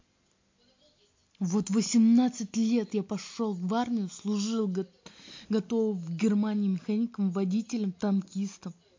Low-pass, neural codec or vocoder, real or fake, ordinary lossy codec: 7.2 kHz; none; real; MP3, 48 kbps